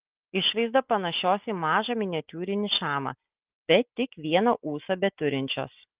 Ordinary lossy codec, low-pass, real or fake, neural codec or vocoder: Opus, 32 kbps; 3.6 kHz; real; none